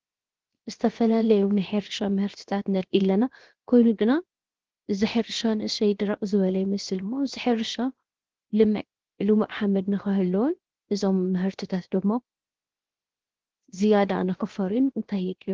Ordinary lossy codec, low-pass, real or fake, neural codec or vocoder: Opus, 16 kbps; 7.2 kHz; fake; codec, 16 kHz, 0.7 kbps, FocalCodec